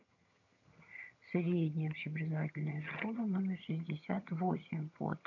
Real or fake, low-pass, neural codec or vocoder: fake; 7.2 kHz; vocoder, 22.05 kHz, 80 mel bands, HiFi-GAN